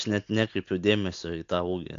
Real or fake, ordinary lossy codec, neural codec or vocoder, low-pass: real; AAC, 64 kbps; none; 7.2 kHz